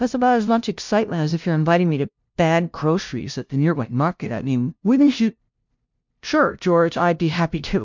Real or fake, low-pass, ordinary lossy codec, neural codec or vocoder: fake; 7.2 kHz; MP3, 64 kbps; codec, 16 kHz, 0.5 kbps, FunCodec, trained on LibriTTS, 25 frames a second